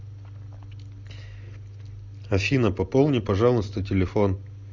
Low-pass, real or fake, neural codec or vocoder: 7.2 kHz; real; none